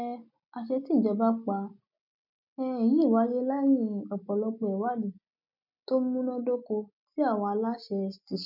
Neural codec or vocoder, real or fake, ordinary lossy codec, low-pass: none; real; none; 5.4 kHz